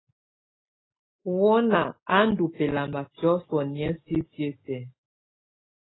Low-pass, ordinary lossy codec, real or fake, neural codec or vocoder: 7.2 kHz; AAC, 16 kbps; real; none